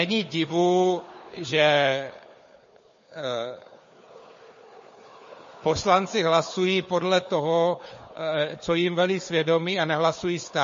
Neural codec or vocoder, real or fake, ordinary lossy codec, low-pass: codec, 16 kHz, 4 kbps, FunCodec, trained on Chinese and English, 50 frames a second; fake; MP3, 32 kbps; 7.2 kHz